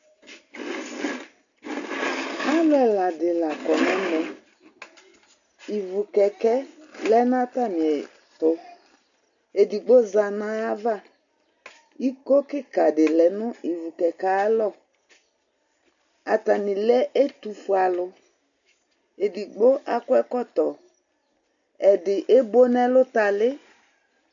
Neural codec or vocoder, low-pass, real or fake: none; 7.2 kHz; real